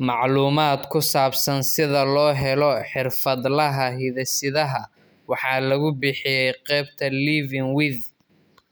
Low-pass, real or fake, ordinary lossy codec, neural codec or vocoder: none; real; none; none